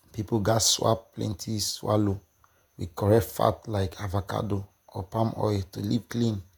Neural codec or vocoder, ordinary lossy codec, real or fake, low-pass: vocoder, 48 kHz, 128 mel bands, Vocos; none; fake; none